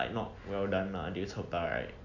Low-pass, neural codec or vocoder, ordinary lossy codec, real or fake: 7.2 kHz; none; none; real